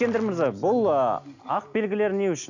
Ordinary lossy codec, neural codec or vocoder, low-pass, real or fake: none; none; 7.2 kHz; real